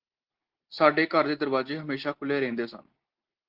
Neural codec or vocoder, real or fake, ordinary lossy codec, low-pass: none; real; Opus, 16 kbps; 5.4 kHz